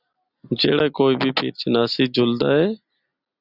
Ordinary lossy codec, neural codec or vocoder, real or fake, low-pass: Opus, 64 kbps; none; real; 5.4 kHz